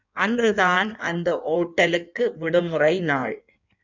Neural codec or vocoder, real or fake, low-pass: codec, 16 kHz in and 24 kHz out, 1.1 kbps, FireRedTTS-2 codec; fake; 7.2 kHz